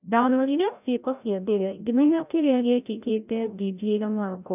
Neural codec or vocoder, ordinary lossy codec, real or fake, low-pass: codec, 16 kHz, 0.5 kbps, FreqCodec, larger model; none; fake; 3.6 kHz